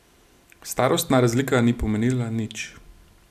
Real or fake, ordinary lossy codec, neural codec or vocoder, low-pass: real; none; none; 14.4 kHz